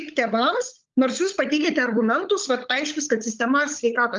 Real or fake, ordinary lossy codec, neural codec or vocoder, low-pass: fake; Opus, 24 kbps; codec, 16 kHz, 16 kbps, FunCodec, trained on LibriTTS, 50 frames a second; 7.2 kHz